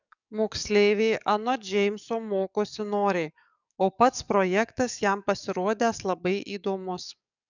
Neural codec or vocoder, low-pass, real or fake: codec, 44.1 kHz, 7.8 kbps, DAC; 7.2 kHz; fake